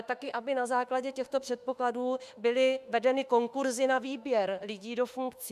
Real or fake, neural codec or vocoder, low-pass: fake; autoencoder, 48 kHz, 32 numbers a frame, DAC-VAE, trained on Japanese speech; 14.4 kHz